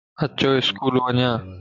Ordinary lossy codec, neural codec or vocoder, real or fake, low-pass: MP3, 64 kbps; none; real; 7.2 kHz